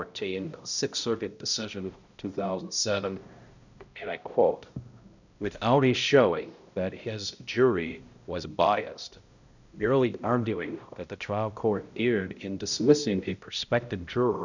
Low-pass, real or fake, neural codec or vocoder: 7.2 kHz; fake; codec, 16 kHz, 0.5 kbps, X-Codec, HuBERT features, trained on balanced general audio